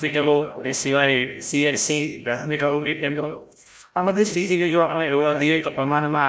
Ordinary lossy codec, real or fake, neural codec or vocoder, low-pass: none; fake; codec, 16 kHz, 0.5 kbps, FreqCodec, larger model; none